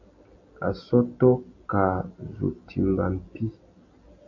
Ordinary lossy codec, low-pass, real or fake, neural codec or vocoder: AAC, 48 kbps; 7.2 kHz; real; none